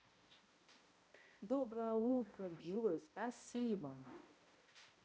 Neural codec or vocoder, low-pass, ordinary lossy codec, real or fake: codec, 16 kHz, 0.5 kbps, X-Codec, HuBERT features, trained on balanced general audio; none; none; fake